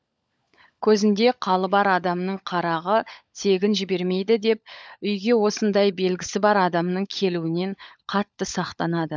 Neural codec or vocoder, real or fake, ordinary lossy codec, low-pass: codec, 16 kHz, 16 kbps, FunCodec, trained on LibriTTS, 50 frames a second; fake; none; none